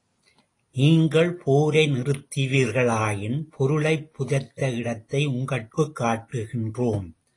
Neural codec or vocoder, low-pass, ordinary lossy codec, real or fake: none; 10.8 kHz; AAC, 32 kbps; real